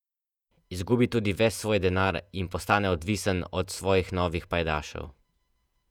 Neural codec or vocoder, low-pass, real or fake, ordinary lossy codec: vocoder, 48 kHz, 128 mel bands, Vocos; 19.8 kHz; fake; none